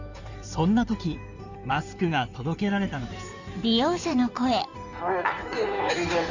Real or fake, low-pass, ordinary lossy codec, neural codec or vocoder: fake; 7.2 kHz; none; codec, 16 kHz in and 24 kHz out, 2.2 kbps, FireRedTTS-2 codec